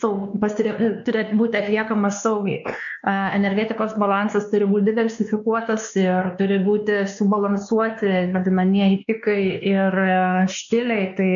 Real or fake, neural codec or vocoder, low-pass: fake; codec, 16 kHz, 2 kbps, X-Codec, WavLM features, trained on Multilingual LibriSpeech; 7.2 kHz